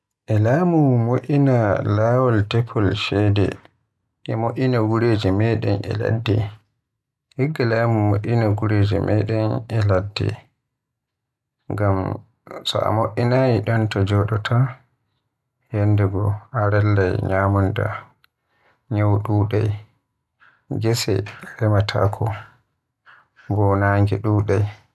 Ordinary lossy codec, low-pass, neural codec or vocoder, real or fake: none; none; none; real